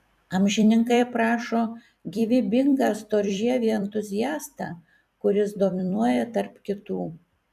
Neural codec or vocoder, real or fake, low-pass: vocoder, 44.1 kHz, 128 mel bands every 256 samples, BigVGAN v2; fake; 14.4 kHz